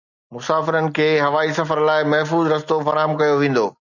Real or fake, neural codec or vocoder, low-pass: real; none; 7.2 kHz